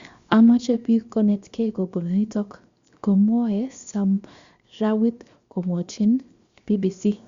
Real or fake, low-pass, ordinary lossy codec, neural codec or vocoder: fake; 7.2 kHz; Opus, 64 kbps; codec, 16 kHz, 0.7 kbps, FocalCodec